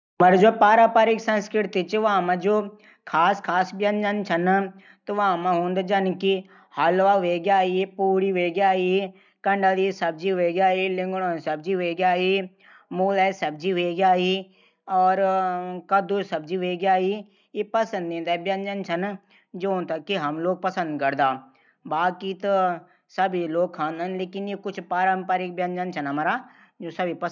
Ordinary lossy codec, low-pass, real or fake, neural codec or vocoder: none; 7.2 kHz; real; none